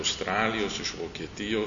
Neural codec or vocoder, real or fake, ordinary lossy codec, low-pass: none; real; MP3, 96 kbps; 7.2 kHz